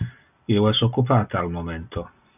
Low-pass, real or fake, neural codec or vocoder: 3.6 kHz; real; none